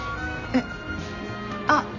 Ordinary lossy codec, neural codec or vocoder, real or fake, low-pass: AAC, 48 kbps; none; real; 7.2 kHz